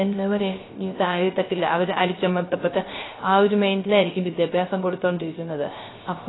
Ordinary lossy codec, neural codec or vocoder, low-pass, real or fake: AAC, 16 kbps; codec, 16 kHz, 0.3 kbps, FocalCodec; 7.2 kHz; fake